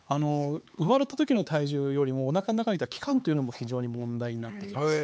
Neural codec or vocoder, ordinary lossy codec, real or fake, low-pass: codec, 16 kHz, 4 kbps, X-Codec, HuBERT features, trained on LibriSpeech; none; fake; none